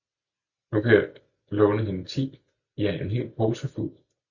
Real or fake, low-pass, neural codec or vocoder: real; 7.2 kHz; none